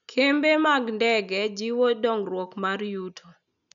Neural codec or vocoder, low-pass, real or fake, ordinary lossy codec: none; 7.2 kHz; real; none